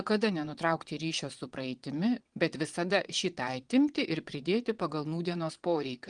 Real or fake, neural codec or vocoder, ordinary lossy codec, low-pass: fake; vocoder, 22.05 kHz, 80 mel bands, WaveNeXt; Opus, 24 kbps; 9.9 kHz